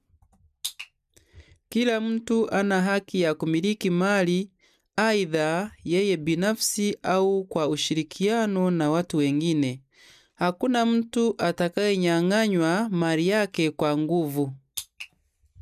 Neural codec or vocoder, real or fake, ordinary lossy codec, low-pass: none; real; none; 14.4 kHz